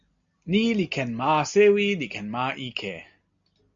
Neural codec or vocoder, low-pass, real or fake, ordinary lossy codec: none; 7.2 kHz; real; MP3, 64 kbps